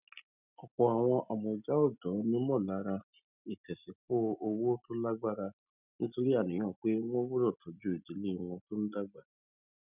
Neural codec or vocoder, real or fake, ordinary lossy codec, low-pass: none; real; none; 3.6 kHz